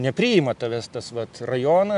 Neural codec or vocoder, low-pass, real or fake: none; 10.8 kHz; real